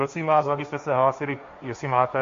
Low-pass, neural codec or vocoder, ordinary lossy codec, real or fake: 7.2 kHz; codec, 16 kHz, 1.1 kbps, Voila-Tokenizer; MP3, 48 kbps; fake